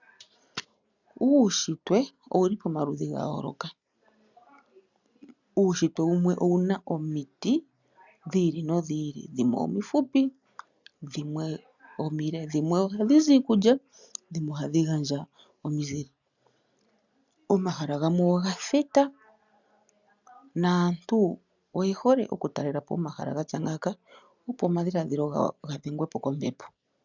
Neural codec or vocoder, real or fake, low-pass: none; real; 7.2 kHz